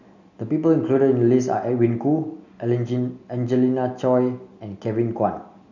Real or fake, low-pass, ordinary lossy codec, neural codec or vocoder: real; 7.2 kHz; none; none